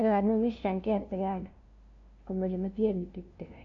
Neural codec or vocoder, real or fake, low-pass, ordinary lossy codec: codec, 16 kHz, 0.5 kbps, FunCodec, trained on LibriTTS, 25 frames a second; fake; 7.2 kHz; MP3, 48 kbps